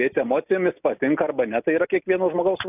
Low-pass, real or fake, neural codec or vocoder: 3.6 kHz; real; none